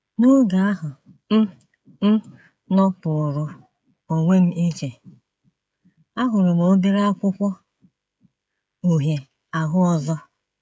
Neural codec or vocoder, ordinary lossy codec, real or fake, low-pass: codec, 16 kHz, 16 kbps, FreqCodec, smaller model; none; fake; none